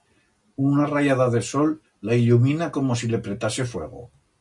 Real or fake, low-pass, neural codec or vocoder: real; 10.8 kHz; none